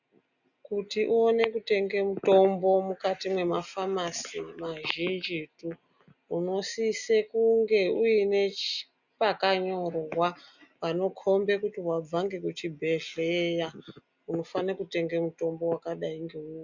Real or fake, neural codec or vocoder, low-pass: real; none; 7.2 kHz